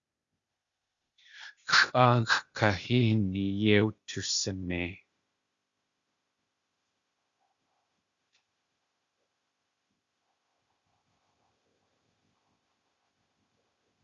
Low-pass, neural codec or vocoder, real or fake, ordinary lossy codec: 7.2 kHz; codec, 16 kHz, 0.8 kbps, ZipCodec; fake; Opus, 64 kbps